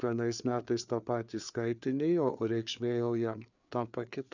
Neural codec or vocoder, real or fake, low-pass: codec, 16 kHz, 4 kbps, FreqCodec, larger model; fake; 7.2 kHz